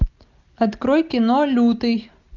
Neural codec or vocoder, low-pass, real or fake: none; 7.2 kHz; real